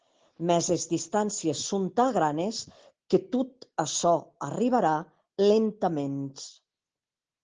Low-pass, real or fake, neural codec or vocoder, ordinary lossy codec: 7.2 kHz; real; none; Opus, 16 kbps